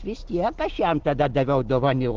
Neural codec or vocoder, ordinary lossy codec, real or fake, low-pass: none; Opus, 16 kbps; real; 7.2 kHz